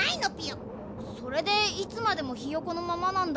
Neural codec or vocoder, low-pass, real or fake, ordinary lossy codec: none; none; real; none